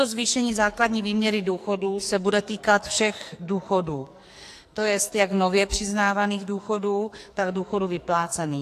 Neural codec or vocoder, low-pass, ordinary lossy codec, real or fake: codec, 44.1 kHz, 2.6 kbps, SNAC; 14.4 kHz; AAC, 64 kbps; fake